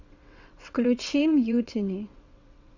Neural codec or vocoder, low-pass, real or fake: vocoder, 22.05 kHz, 80 mel bands, WaveNeXt; 7.2 kHz; fake